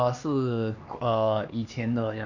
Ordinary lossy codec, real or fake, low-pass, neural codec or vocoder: none; fake; 7.2 kHz; codec, 16 kHz, 2 kbps, X-Codec, HuBERT features, trained on LibriSpeech